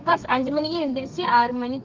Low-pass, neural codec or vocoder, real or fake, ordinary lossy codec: 7.2 kHz; codec, 32 kHz, 1.9 kbps, SNAC; fake; Opus, 32 kbps